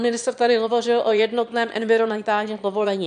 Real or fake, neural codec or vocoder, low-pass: fake; autoencoder, 22.05 kHz, a latent of 192 numbers a frame, VITS, trained on one speaker; 9.9 kHz